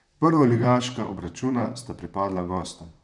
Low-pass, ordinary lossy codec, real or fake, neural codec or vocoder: 10.8 kHz; none; fake; vocoder, 44.1 kHz, 128 mel bands, Pupu-Vocoder